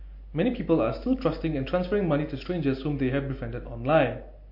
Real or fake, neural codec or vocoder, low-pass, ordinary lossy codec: real; none; 5.4 kHz; MP3, 32 kbps